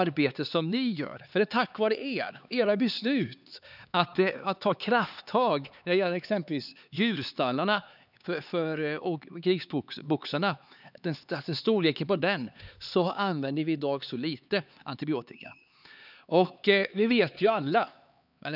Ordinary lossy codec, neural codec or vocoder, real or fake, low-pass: none; codec, 16 kHz, 4 kbps, X-Codec, HuBERT features, trained on LibriSpeech; fake; 5.4 kHz